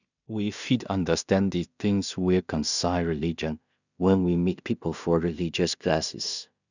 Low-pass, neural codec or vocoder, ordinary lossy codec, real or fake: 7.2 kHz; codec, 16 kHz in and 24 kHz out, 0.4 kbps, LongCat-Audio-Codec, two codebook decoder; none; fake